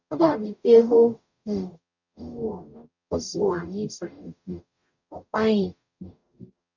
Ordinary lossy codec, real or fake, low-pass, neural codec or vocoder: none; fake; 7.2 kHz; codec, 44.1 kHz, 0.9 kbps, DAC